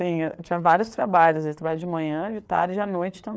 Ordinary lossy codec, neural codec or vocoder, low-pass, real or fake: none; codec, 16 kHz, 4 kbps, FreqCodec, larger model; none; fake